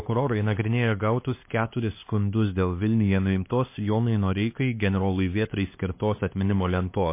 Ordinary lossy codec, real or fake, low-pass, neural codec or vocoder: MP3, 24 kbps; fake; 3.6 kHz; codec, 16 kHz, 2 kbps, X-Codec, HuBERT features, trained on LibriSpeech